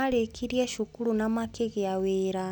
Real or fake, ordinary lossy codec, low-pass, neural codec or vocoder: real; none; 19.8 kHz; none